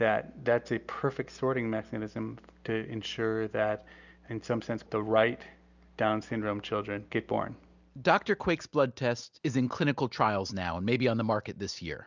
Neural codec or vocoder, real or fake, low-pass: none; real; 7.2 kHz